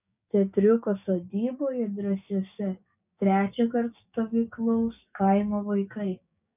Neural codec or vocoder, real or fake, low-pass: codec, 16 kHz, 6 kbps, DAC; fake; 3.6 kHz